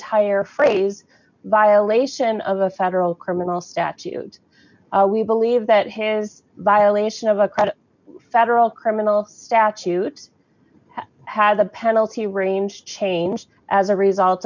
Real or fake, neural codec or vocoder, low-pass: real; none; 7.2 kHz